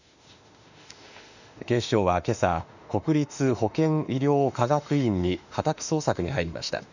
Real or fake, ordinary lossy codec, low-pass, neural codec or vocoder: fake; none; 7.2 kHz; autoencoder, 48 kHz, 32 numbers a frame, DAC-VAE, trained on Japanese speech